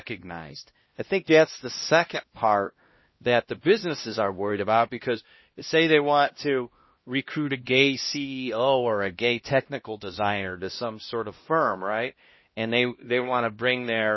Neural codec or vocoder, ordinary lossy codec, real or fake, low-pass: codec, 16 kHz, 1 kbps, X-Codec, HuBERT features, trained on LibriSpeech; MP3, 24 kbps; fake; 7.2 kHz